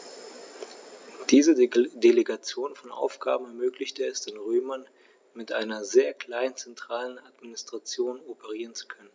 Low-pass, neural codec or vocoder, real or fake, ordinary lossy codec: none; none; real; none